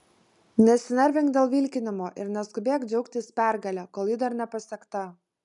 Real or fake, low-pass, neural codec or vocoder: real; 10.8 kHz; none